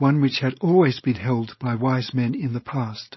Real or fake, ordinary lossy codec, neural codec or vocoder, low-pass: fake; MP3, 24 kbps; codec, 16 kHz, 4.8 kbps, FACodec; 7.2 kHz